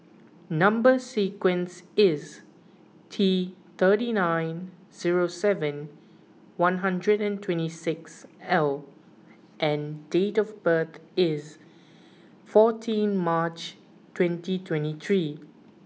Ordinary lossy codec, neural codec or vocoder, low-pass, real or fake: none; none; none; real